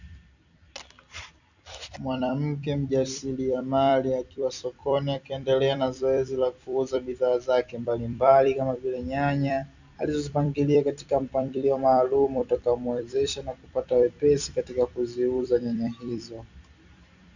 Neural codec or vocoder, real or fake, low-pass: vocoder, 44.1 kHz, 128 mel bands every 256 samples, BigVGAN v2; fake; 7.2 kHz